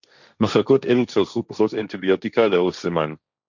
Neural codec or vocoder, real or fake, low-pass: codec, 16 kHz, 1.1 kbps, Voila-Tokenizer; fake; 7.2 kHz